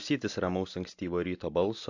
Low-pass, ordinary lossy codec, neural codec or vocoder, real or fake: 7.2 kHz; AAC, 48 kbps; none; real